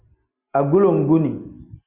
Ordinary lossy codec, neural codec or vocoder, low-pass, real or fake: Opus, 64 kbps; none; 3.6 kHz; real